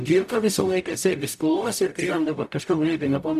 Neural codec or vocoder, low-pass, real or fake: codec, 44.1 kHz, 0.9 kbps, DAC; 14.4 kHz; fake